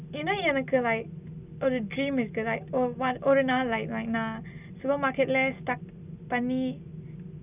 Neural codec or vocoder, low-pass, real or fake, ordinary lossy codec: none; 3.6 kHz; real; none